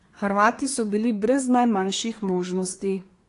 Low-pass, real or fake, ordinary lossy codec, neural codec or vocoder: 10.8 kHz; fake; AAC, 48 kbps; codec, 24 kHz, 1 kbps, SNAC